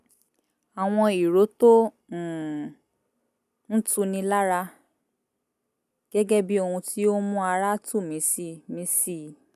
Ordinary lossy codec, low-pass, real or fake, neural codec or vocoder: none; 14.4 kHz; real; none